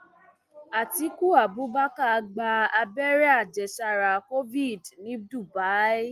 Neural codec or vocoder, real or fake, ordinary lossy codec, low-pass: none; real; Opus, 32 kbps; 14.4 kHz